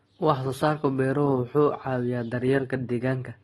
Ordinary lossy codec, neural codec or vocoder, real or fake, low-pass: AAC, 32 kbps; none; real; 19.8 kHz